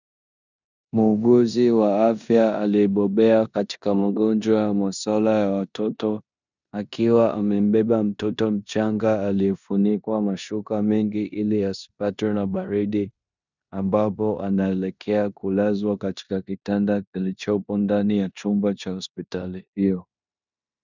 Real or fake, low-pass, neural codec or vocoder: fake; 7.2 kHz; codec, 16 kHz in and 24 kHz out, 0.9 kbps, LongCat-Audio-Codec, four codebook decoder